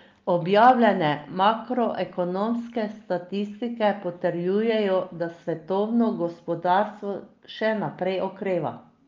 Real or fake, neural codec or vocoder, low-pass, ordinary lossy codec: real; none; 7.2 kHz; Opus, 24 kbps